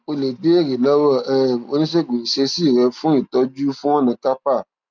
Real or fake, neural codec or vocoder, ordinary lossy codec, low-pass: real; none; none; 7.2 kHz